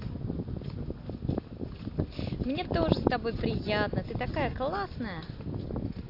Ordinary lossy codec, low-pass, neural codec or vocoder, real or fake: AAC, 32 kbps; 5.4 kHz; none; real